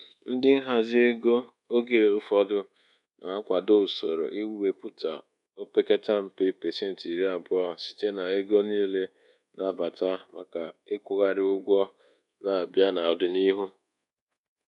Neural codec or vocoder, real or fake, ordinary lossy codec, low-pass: codec, 24 kHz, 1.2 kbps, DualCodec; fake; none; 10.8 kHz